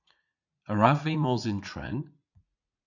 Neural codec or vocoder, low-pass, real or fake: vocoder, 44.1 kHz, 80 mel bands, Vocos; 7.2 kHz; fake